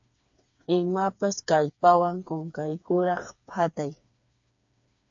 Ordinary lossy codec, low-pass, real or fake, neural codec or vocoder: AAC, 64 kbps; 7.2 kHz; fake; codec, 16 kHz, 4 kbps, FreqCodec, smaller model